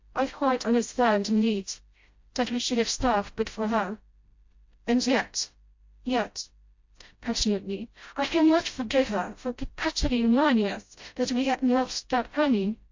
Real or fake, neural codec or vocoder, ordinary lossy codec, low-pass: fake; codec, 16 kHz, 0.5 kbps, FreqCodec, smaller model; MP3, 48 kbps; 7.2 kHz